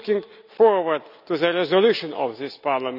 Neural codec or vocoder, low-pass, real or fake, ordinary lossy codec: none; 5.4 kHz; real; none